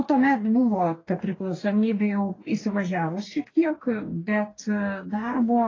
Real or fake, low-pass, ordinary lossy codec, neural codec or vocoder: fake; 7.2 kHz; AAC, 32 kbps; codec, 44.1 kHz, 2.6 kbps, DAC